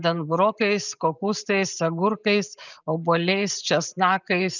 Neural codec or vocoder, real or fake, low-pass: none; real; 7.2 kHz